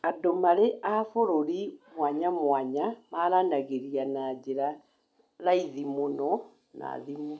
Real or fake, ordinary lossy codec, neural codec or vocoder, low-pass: real; none; none; none